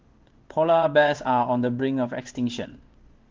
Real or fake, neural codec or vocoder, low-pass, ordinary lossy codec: fake; codec, 16 kHz in and 24 kHz out, 1 kbps, XY-Tokenizer; 7.2 kHz; Opus, 16 kbps